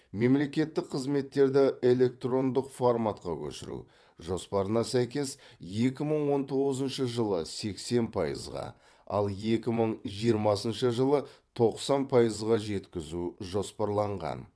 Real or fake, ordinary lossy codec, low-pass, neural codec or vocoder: fake; none; none; vocoder, 22.05 kHz, 80 mel bands, WaveNeXt